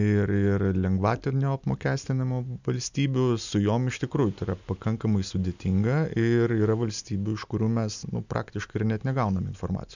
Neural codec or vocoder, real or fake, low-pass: none; real; 7.2 kHz